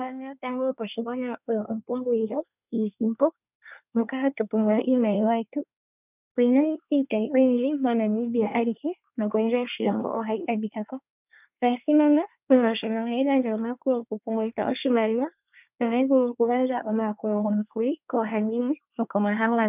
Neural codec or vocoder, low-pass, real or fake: codec, 24 kHz, 1 kbps, SNAC; 3.6 kHz; fake